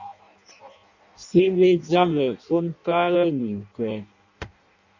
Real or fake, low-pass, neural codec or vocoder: fake; 7.2 kHz; codec, 16 kHz in and 24 kHz out, 0.6 kbps, FireRedTTS-2 codec